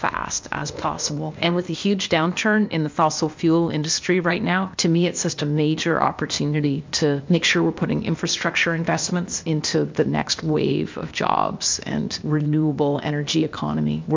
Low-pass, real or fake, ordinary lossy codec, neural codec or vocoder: 7.2 kHz; fake; MP3, 64 kbps; codec, 16 kHz, 0.8 kbps, ZipCodec